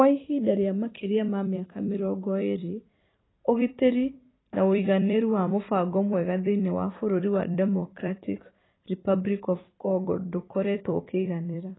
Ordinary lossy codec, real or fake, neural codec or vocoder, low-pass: AAC, 16 kbps; fake; vocoder, 44.1 kHz, 128 mel bands every 256 samples, BigVGAN v2; 7.2 kHz